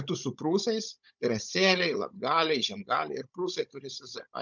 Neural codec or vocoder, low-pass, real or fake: codec, 16 kHz, 16 kbps, FunCodec, trained on Chinese and English, 50 frames a second; 7.2 kHz; fake